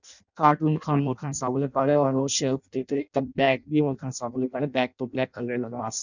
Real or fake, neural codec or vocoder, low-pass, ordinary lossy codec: fake; codec, 16 kHz in and 24 kHz out, 0.6 kbps, FireRedTTS-2 codec; 7.2 kHz; none